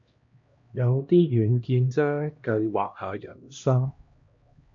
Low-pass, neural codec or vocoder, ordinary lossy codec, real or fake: 7.2 kHz; codec, 16 kHz, 1 kbps, X-Codec, HuBERT features, trained on LibriSpeech; MP3, 48 kbps; fake